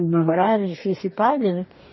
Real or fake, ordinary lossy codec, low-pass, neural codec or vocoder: fake; MP3, 24 kbps; 7.2 kHz; codec, 44.1 kHz, 2.6 kbps, DAC